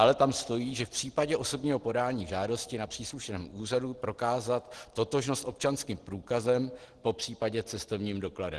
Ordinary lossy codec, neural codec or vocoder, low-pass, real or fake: Opus, 16 kbps; none; 10.8 kHz; real